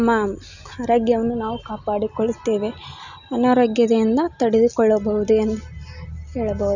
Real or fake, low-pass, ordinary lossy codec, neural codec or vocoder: real; 7.2 kHz; none; none